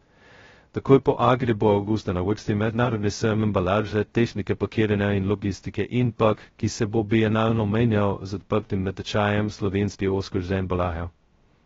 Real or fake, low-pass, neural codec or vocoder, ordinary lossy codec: fake; 7.2 kHz; codec, 16 kHz, 0.2 kbps, FocalCodec; AAC, 24 kbps